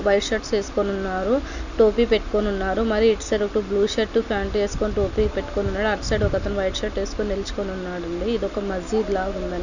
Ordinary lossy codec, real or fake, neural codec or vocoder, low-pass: none; real; none; 7.2 kHz